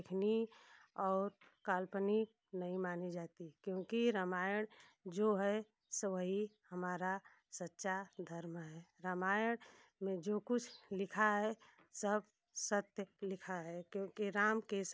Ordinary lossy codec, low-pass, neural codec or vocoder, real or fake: none; none; none; real